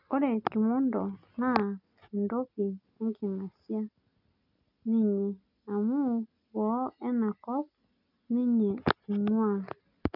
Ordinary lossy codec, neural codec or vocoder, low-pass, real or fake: none; none; 5.4 kHz; real